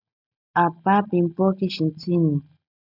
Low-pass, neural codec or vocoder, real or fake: 5.4 kHz; none; real